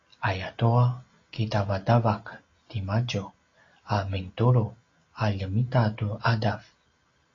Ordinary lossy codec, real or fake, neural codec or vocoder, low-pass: AAC, 32 kbps; real; none; 7.2 kHz